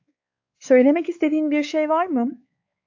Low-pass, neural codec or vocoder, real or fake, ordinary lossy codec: 7.2 kHz; codec, 16 kHz, 4 kbps, X-Codec, WavLM features, trained on Multilingual LibriSpeech; fake; AAC, 48 kbps